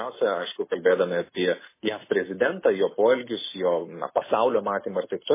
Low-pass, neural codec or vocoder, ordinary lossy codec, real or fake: 3.6 kHz; none; MP3, 16 kbps; real